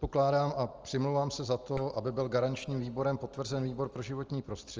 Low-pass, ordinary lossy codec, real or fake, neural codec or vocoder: 7.2 kHz; Opus, 24 kbps; real; none